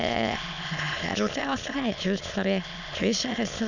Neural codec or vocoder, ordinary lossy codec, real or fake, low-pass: autoencoder, 22.05 kHz, a latent of 192 numbers a frame, VITS, trained on many speakers; none; fake; 7.2 kHz